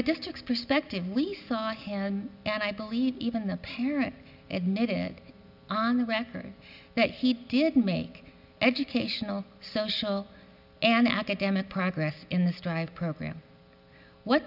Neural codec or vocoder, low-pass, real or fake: none; 5.4 kHz; real